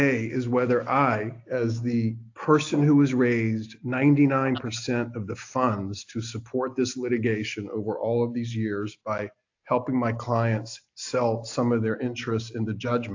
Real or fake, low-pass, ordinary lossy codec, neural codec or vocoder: real; 7.2 kHz; AAC, 48 kbps; none